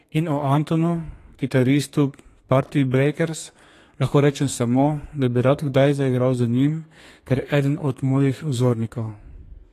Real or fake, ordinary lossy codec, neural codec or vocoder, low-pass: fake; AAC, 48 kbps; codec, 32 kHz, 1.9 kbps, SNAC; 14.4 kHz